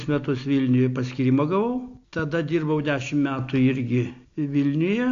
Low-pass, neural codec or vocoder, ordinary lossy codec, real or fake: 7.2 kHz; none; AAC, 48 kbps; real